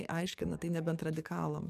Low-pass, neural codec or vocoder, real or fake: 14.4 kHz; codec, 44.1 kHz, 7.8 kbps, DAC; fake